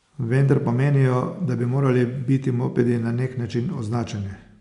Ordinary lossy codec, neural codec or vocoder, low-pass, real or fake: none; none; 10.8 kHz; real